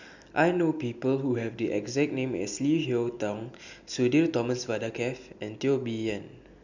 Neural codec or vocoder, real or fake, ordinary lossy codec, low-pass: none; real; none; 7.2 kHz